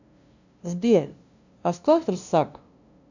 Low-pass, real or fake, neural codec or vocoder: 7.2 kHz; fake; codec, 16 kHz, 0.5 kbps, FunCodec, trained on LibriTTS, 25 frames a second